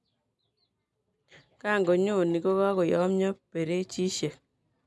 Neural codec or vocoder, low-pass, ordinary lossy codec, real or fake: none; none; none; real